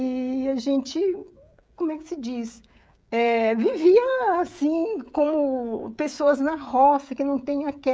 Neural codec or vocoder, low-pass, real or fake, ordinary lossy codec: codec, 16 kHz, 16 kbps, FreqCodec, smaller model; none; fake; none